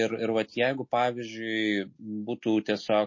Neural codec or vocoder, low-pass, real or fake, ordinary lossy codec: none; 7.2 kHz; real; MP3, 32 kbps